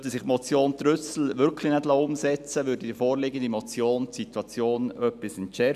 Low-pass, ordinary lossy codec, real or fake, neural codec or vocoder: 14.4 kHz; none; real; none